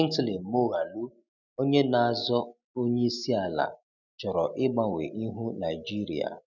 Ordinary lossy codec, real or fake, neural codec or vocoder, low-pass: none; real; none; 7.2 kHz